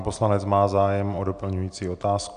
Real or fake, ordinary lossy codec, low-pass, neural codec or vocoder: real; AAC, 96 kbps; 9.9 kHz; none